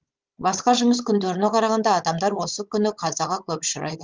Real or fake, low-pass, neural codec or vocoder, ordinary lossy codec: fake; 7.2 kHz; codec, 16 kHz, 16 kbps, FunCodec, trained on Chinese and English, 50 frames a second; Opus, 32 kbps